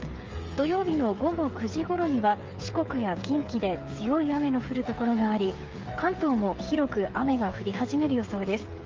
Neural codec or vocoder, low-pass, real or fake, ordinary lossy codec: codec, 16 kHz, 8 kbps, FreqCodec, smaller model; 7.2 kHz; fake; Opus, 32 kbps